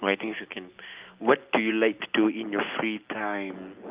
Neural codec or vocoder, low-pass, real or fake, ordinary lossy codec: none; 3.6 kHz; real; Opus, 24 kbps